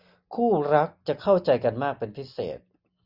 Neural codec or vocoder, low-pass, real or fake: none; 5.4 kHz; real